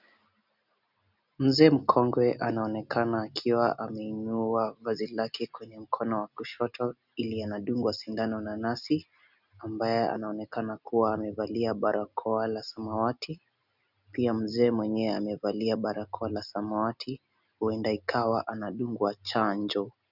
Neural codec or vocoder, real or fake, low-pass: none; real; 5.4 kHz